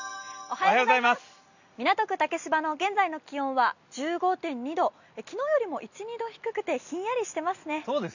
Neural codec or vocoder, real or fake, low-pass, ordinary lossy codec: none; real; 7.2 kHz; none